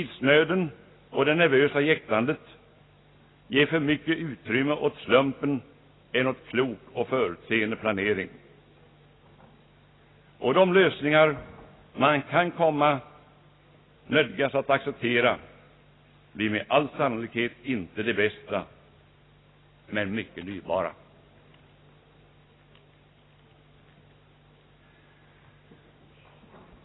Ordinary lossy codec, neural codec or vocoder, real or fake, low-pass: AAC, 16 kbps; none; real; 7.2 kHz